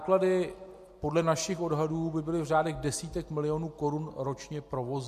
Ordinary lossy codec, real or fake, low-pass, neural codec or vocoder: MP3, 64 kbps; real; 14.4 kHz; none